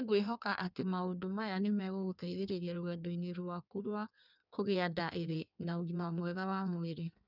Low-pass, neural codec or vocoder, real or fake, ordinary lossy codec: 5.4 kHz; codec, 16 kHz in and 24 kHz out, 1.1 kbps, FireRedTTS-2 codec; fake; none